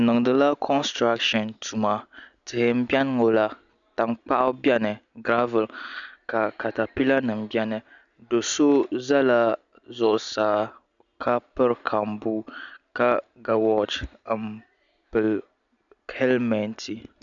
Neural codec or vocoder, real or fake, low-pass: none; real; 7.2 kHz